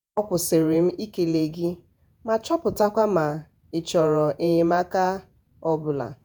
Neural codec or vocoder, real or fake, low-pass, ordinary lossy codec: vocoder, 48 kHz, 128 mel bands, Vocos; fake; none; none